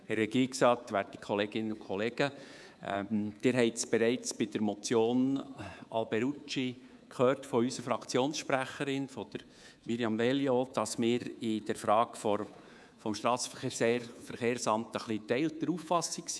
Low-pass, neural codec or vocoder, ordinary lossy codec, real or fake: none; codec, 24 kHz, 3.1 kbps, DualCodec; none; fake